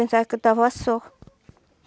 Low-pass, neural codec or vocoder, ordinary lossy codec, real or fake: none; none; none; real